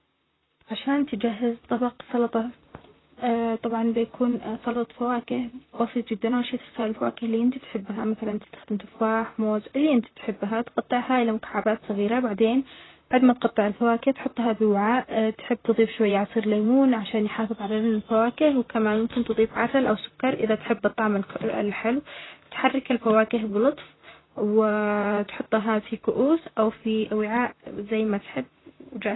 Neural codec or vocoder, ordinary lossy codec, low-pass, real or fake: vocoder, 44.1 kHz, 128 mel bands, Pupu-Vocoder; AAC, 16 kbps; 7.2 kHz; fake